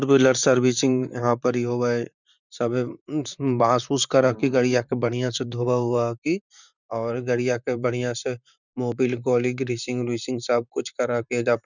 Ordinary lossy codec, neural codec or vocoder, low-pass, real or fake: none; vocoder, 44.1 kHz, 128 mel bands, Pupu-Vocoder; 7.2 kHz; fake